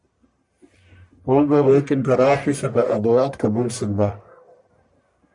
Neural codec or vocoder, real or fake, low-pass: codec, 44.1 kHz, 1.7 kbps, Pupu-Codec; fake; 10.8 kHz